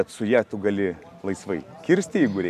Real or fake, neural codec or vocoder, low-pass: real; none; 14.4 kHz